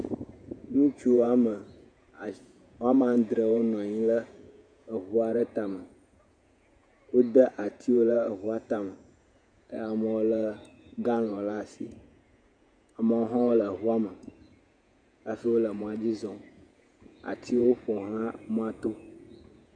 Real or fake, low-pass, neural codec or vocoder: fake; 9.9 kHz; vocoder, 48 kHz, 128 mel bands, Vocos